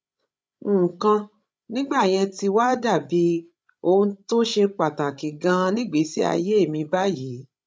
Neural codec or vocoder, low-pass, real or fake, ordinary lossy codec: codec, 16 kHz, 16 kbps, FreqCodec, larger model; none; fake; none